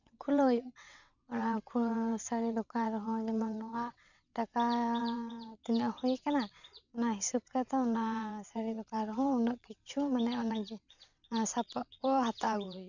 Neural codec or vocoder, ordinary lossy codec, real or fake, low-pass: vocoder, 22.05 kHz, 80 mel bands, WaveNeXt; none; fake; 7.2 kHz